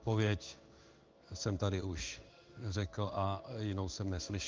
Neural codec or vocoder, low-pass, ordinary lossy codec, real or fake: codec, 16 kHz in and 24 kHz out, 1 kbps, XY-Tokenizer; 7.2 kHz; Opus, 32 kbps; fake